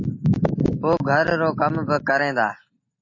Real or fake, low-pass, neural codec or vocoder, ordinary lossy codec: real; 7.2 kHz; none; MP3, 32 kbps